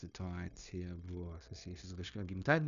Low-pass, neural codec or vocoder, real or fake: 7.2 kHz; codec, 16 kHz, 0.9 kbps, LongCat-Audio-Codec; fake